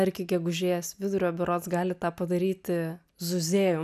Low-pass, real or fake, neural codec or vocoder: 14.4 kHz; real; none